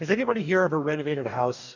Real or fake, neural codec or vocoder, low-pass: fake; codec, 44.1 kHz, 2.6 kbps, DAC; 7.2 kHz